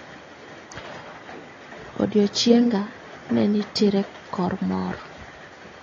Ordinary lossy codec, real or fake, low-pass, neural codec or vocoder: AAC, 32 kbps; real; 7.2 kHz; none